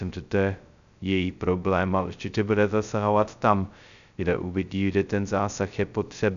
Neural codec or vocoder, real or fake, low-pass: codec, 16 kHz, 0.2 kbps, FocalCodec; fake; 7.2 kHz